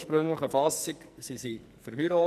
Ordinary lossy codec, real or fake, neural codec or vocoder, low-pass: none; fake; codec, 44.1 kHz, 2.6 kbps, SNAC; 14.4 kHz